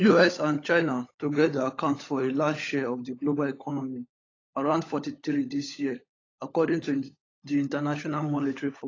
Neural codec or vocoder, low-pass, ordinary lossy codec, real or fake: codec, 16 kHz, 16 kbps, FunCodec, trained on LibriTTS, 50 frames a second; 7.2 kHz; AAC, 32 kbps; fake